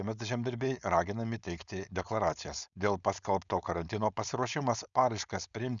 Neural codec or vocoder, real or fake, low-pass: codec, 16 kHz, 4.8 kbps, FACodec; fake; 7.2 kHz